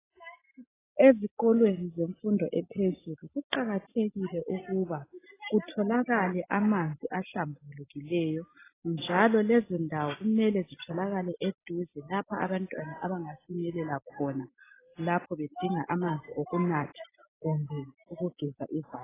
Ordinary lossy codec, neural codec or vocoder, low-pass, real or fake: AAC, 16 kbps; none; 3.6 kHz; real